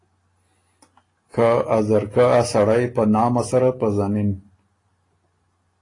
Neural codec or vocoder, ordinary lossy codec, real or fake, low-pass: none; AAC, 32 kbps; real; 10.8 kHz